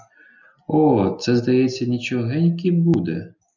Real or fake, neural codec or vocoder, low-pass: real; none; 7.2 kHz